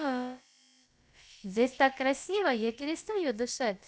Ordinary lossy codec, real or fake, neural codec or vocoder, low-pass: none; fake; codec, 16 kHz, about 1 kbps, DyCAST, with the encoder's durations; none